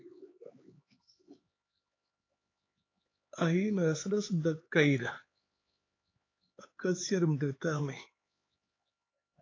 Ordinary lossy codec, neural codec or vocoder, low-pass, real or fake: AAC, 32 kbps; codec, 16 kHz, 4 kbps, X-Codec, HuBERT features, trained on LibriSpeech; 7.2 kHz; fake